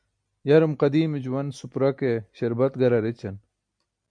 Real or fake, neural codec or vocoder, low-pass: real; none; 9.9 kHz